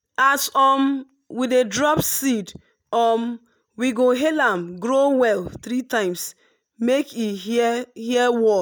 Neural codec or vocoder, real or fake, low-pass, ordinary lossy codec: none; real; none; none